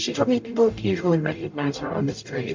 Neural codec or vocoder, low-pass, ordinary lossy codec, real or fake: codec, 44.1 kHz, 0.9 kbps, DAC; 7.2 kHz; MP3, 48 kbps; fake